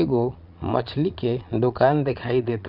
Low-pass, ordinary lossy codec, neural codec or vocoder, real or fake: 5.4 kHz; none; vocoder, 22.05 kHz, 80 mel bands, Vocos; fake